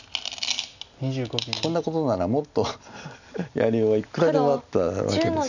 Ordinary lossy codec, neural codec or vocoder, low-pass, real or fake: none; none; 7.2 kHz; real